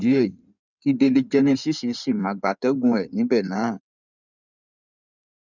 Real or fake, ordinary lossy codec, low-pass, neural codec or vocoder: fake; none; 7.2 kHz; codec, 16 kHz in and 24 kHz out, 2.2 kbps, FireRedTTS-2 codec